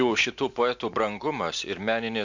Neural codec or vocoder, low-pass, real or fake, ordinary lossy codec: none; 7.2 kHz; real; MP3, 64 kbps